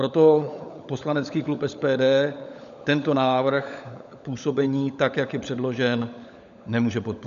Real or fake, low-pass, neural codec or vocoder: fake; 7.2 kHz; codec, 16 kHz, 16 kbps, FunCodec, trained on LibriTTS, 50 frames a second